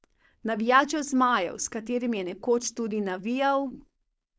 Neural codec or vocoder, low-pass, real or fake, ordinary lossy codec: codec, 16 kHz, 4.8 kbps, FACodec; none; fake; none